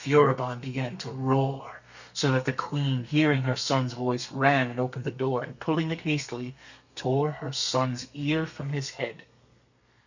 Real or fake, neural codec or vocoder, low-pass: fake; codec, 32 kHz, 1.9 kbps, SNAC; 7.2 kHz